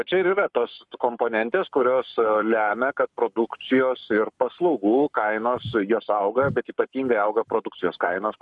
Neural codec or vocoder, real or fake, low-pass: codec, 44.1 kHz, 7.8 kbps, Pupu-Codec; fake; 10.8 kHz